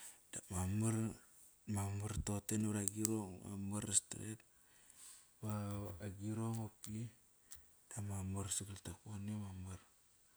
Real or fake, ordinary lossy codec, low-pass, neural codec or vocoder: real; none; none; none